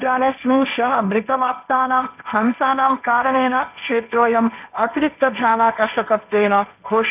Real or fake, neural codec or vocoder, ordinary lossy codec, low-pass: fake; codec, 16 kHz, 1.1 kbps, Voila-Tokenizer; none; 3.6 kHz